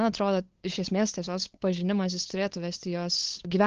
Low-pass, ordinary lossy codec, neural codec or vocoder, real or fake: 7.2 kHz; Opus, 16 kbps; codec, 16 kHz, 8 kbps, FunCodec, trained on LibriTTS, 25 frames a second; fake